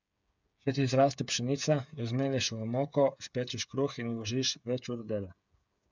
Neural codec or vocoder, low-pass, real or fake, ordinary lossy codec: codec, 16 kHz, 8 kbps, FreqCodec, smaller model; 7.2 kHz; fake; none